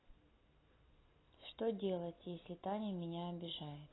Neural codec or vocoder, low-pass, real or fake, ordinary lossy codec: none; 7.2 kHz; real; AAC, 16 kbps